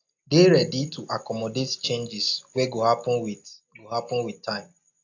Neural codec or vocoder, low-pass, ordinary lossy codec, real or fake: none; 7.2 kHz; AAC, 48 kbps; real